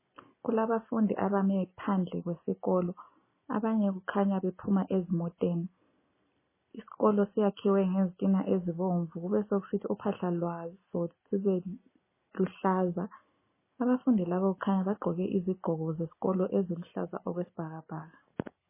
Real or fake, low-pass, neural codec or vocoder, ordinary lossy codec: real; 3.6 kHz; none; MP3, 16 kbps